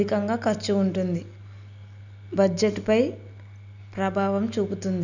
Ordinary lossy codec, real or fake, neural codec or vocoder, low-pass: none; real; none; 7.2 kHz